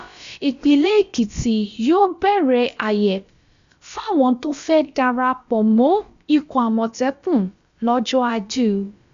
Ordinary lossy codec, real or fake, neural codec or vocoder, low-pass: Opus, 64 kbps; fake; codec, 16 kHz, about 1 kbps, DyCAST, with the encoder's durations; 7.2 kHz